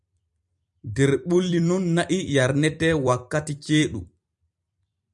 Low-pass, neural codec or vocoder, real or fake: 10.8 kHz; vocoder, 44.1 kHz, 128 mel bands every 256 samples, BigVGAN v2; fake